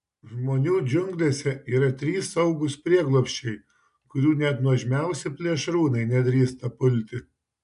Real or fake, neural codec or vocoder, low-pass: real; none; 10.8 kHz